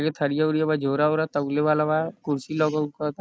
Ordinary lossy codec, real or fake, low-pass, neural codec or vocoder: none; real; none; none